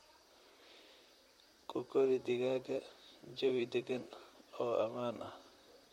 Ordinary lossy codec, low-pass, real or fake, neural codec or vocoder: MP3, 64 kbps; 19.8 kHz; fake; vocoder, 44.1 kHz, 128 mel bands, Pupu-Vocoder